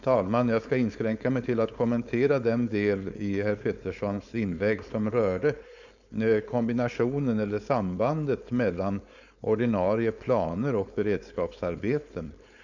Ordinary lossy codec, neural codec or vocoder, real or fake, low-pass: none; codec, 16 kHz, 4.8 kbps, FACodec; fake; 7.2 kHz